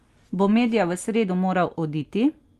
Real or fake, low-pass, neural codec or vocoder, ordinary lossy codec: real; 14.4 kHz; none; Opus, 32 kbps